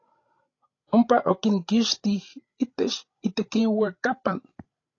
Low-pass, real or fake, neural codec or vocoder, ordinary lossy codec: 7.2 kHz; fake; codec, 16 kHz, 16 kbps, FreqCodec, larger model; AAC, 32 kbps